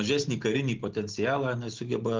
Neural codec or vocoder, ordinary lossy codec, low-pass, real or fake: none; Opus, 16 kbps; 7.2 kHz; real